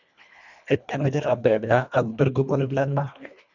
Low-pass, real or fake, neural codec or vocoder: 7.2 kHz; fake; codec, 24 kHz, 1.5 kbps, HILCodec